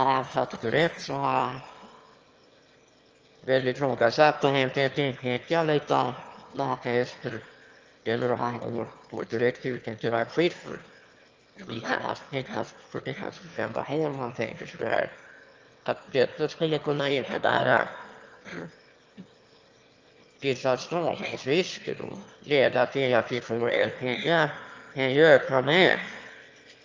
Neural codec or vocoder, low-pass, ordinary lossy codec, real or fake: autoencoder, 22.05 kHz, a latent of 192 numbers a frame, VITS, trained on one speaker; 7.2 kHz; Opus, 24 kbps; fake